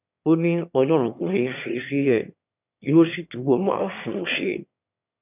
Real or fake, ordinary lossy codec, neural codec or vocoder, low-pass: fake; none; autoencoder, 22.05 kHz, a latent of 192 numbers a frame, VITS, trained on one speaker; 3.6 kHz